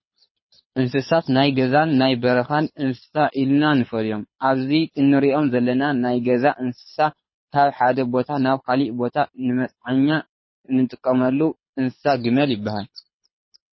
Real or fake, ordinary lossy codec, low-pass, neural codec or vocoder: fake; MP3, 24 kbps; 7.2 kHz; codec, 24 kHz, 6 kbps, HILCodec